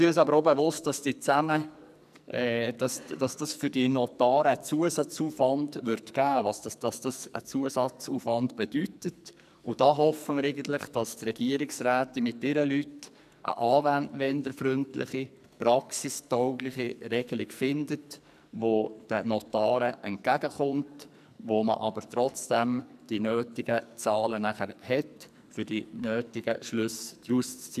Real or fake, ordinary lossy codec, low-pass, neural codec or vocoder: fake; none; 14.4 kHz; codec, 44.1 kHz, 2.6 kbps, SNAC